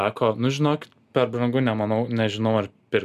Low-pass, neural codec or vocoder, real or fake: 14.4 kHz; none; real